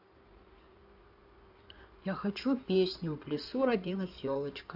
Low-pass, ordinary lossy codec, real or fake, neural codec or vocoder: 5.4 kHz; AAC, 48 kbps; fake; codec, 16 kHz in and 24 kHz out, 2.2 kbps, FireRedTTS-2 codec